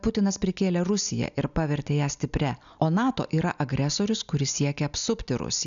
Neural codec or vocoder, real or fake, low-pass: none; real; 7.2 kHz